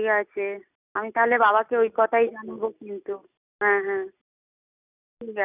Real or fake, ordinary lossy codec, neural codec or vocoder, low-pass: real; none; none; 3.6 kHz